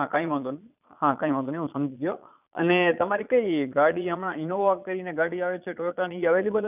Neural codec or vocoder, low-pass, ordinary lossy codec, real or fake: vocoder, 22.05 kHz, 80 mel bands, Vocos; 3.6 kHz; none; fake